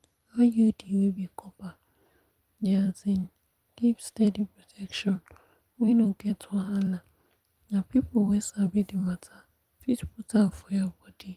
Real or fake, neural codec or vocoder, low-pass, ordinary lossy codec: fake; vocoder, 44.1 kHz, 128 mel bands every 256 samples, BigVGAN v2; 14.4 kHz; Opus, 32 kbps